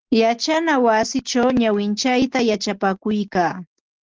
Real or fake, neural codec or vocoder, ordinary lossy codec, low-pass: real; none; Opus, 16 kbps; 7.2 kHz